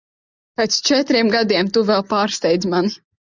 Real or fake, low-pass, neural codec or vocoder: real; 7.2 kHz; none